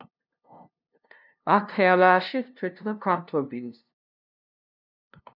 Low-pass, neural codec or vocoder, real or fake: 5.4 kHz; codec, 16 kHz, 0.5 kbps, FunCodec, trained on LibriTTS, 25 frames a second; fake